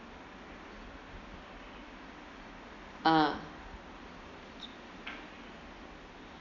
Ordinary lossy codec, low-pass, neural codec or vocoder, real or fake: none; 7.2 kHz; none; real